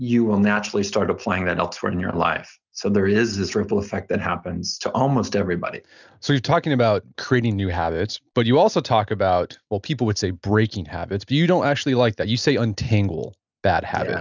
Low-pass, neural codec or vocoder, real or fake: 7.2 kHz; none; real